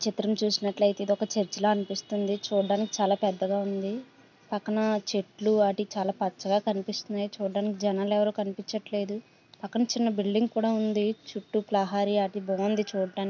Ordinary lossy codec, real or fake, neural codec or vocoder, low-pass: none; real; none; 7.2 kHz